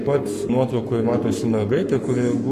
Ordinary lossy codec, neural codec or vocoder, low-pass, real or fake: AAC, 64 kbps; codec, 44.1 kHz, 7.8 kbps, Pupu-Codec; 14.4 kHz; fake